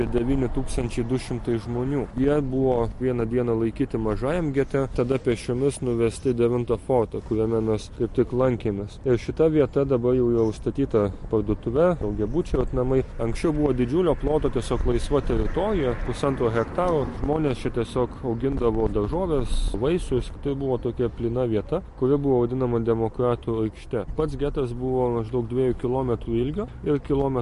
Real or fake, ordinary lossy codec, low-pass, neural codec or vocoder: real; MP3, 48 kbps; 14.4 kHz; none